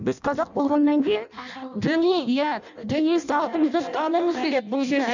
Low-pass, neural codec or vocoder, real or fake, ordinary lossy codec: 7.2 kHz; codec, 16 kHz in and 24 kHz out, 0.6 kbps, FireRedTTS-2 codec; fake; none